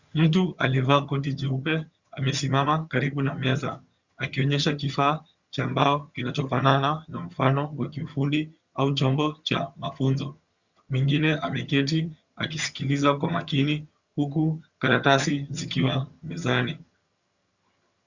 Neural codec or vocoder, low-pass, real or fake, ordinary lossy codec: vocoder, 22.05 kHz, 80 mel bands, HiFi-GAN; 7.2 kHz; fake; Opus, 64 kbps